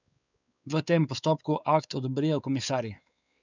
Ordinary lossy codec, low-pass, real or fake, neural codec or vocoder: none; 7.2 kHz; fake; codec, 16 kHz, 4 kbps, X-Codec, WavLM features, trained on Multilingual LibriSpeech